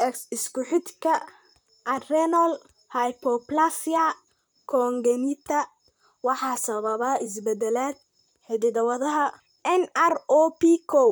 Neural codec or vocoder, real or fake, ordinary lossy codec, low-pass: vocoder, 44.1 kHz, 128 mel bands, Pupu-Vocoder; fake; none; none